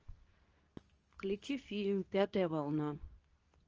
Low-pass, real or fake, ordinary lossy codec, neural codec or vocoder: 7.2 kHz; fake; Opus, 24 kbps; codec, 24 kHz, 0.9 kbps, WavTokenizer, medium speech release version 2